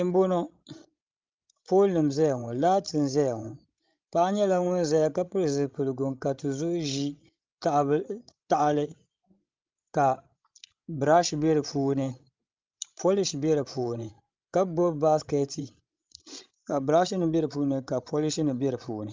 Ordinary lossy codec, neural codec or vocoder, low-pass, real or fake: Opus, 24 kbps; codec, 16 kHz, 16 kbps, FreqCodec, larger model; 7.2 kHz; fake